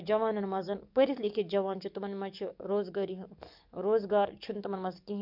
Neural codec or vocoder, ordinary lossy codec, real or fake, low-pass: codec, 44.1 kHz, 7.8 kbps, DAC; none; fake; 5.4 kHz